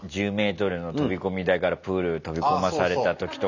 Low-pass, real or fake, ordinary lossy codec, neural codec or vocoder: 7.2 kHz; real; none; none